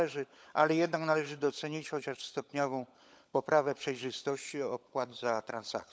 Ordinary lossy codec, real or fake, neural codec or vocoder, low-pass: none; fake; codec, 16 kHz, 16 kbps, FunCodec, trained on LibriTTS, 50 frames a second; none